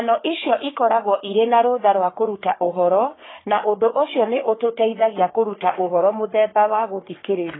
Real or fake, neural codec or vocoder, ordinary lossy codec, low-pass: fake; codec, 44.1 kHz, 3.4 kbps, Pupu-Codec; AAC, 16 kbps; 7.2 kHz